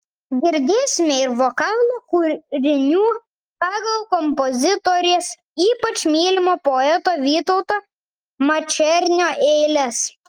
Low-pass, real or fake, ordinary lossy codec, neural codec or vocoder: 19.8 kHz; real; Opus, 24 kbps; none